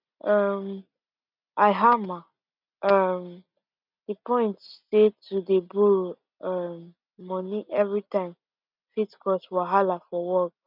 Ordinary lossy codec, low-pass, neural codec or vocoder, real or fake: none; 5.4 kHz; none; real